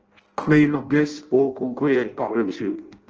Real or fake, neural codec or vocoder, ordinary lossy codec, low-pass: fake; codec, 16 kHz in and 24 kHz out, 0.6 kbps, FireRedTTS-2 codec; Opus, 16 kbps; 7.2 kHz